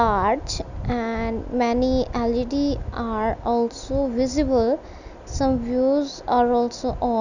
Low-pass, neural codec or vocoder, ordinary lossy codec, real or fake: 7.2 kHz; none; none; real